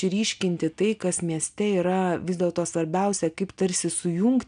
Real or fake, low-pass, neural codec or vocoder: real; 9.9 kHz; none